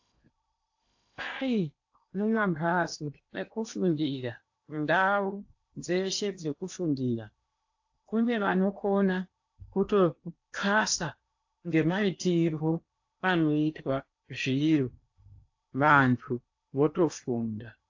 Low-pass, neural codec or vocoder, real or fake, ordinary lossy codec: 7.2 kHz; codec, 16 kHz in and 24 kHz out, 0.8 kbps, FocalCodec, streaming, 65536 codes; fake; AAC, 48 kbps